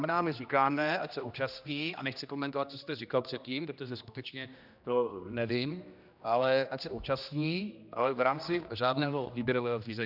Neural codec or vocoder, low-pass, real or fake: codec, 16 kHz, 1 kbps, X-Codec, HuBERT features, trained on general audio; 5.4 kHz; fake